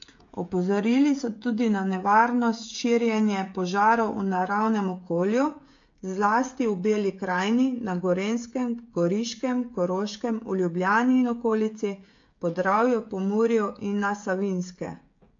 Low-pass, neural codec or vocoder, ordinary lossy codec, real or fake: 7.2 kHz; codec, 16 kHz, 16 kbps, FreqCodec, smaller model; AAC, 48 kbps; fake